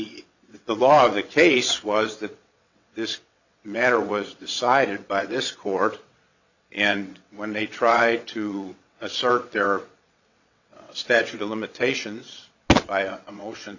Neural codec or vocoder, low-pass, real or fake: vocoder, 22.05 kHz, 80 mel bands, WaveNeXt; 7.2 kHz; fake